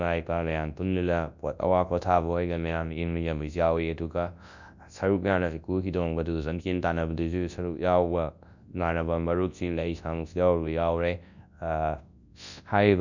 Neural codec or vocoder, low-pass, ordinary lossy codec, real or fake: codec, 24 kHz, 0.9 kbps, WavTokenizer, large speech release; 7.2 kHz; none; fake